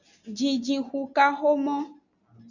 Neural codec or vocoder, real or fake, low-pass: none; real; 7.2 kHz